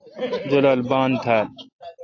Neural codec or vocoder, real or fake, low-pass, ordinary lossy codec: none; real; 7.2 kHz; AAC, 48 kbps